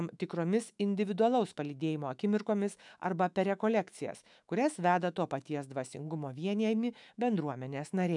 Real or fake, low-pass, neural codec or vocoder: fake; 10.8 kHz; autoencoder, 48 kHz, 128 numbers a frame, DAC-VAE, trained on Japanese speech